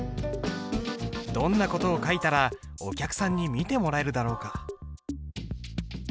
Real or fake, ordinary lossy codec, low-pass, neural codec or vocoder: real; none; none; none